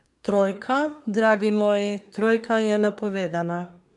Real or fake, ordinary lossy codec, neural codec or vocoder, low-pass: fake; none; codec, 24 kHz, 1 kbps, SNAC; 10.8 kHz